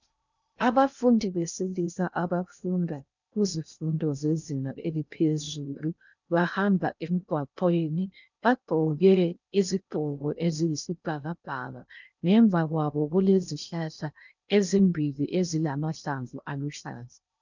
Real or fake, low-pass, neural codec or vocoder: fake; 7.2 kHz; codec, 16 kHz in and 24 kHz out, 0.6 kbps, FocalCodec, streaming, 2048 codes